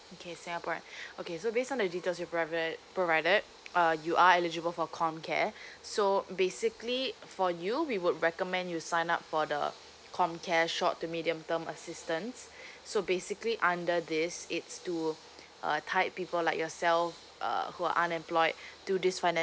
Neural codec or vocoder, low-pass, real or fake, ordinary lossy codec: none; none; real; none